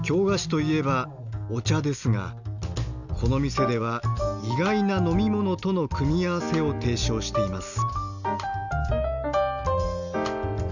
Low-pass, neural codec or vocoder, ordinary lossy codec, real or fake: 7.2 kHz; none; none; real